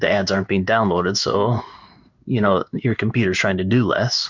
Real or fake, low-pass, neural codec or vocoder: fake; 7.2 kHz; codec, 16 kHz in and 24 kHz out, 1 kbps, XY-Tokenizer